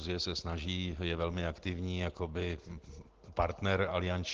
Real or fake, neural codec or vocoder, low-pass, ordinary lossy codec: real; none; 7.2 kHz; Opus, 16 kbps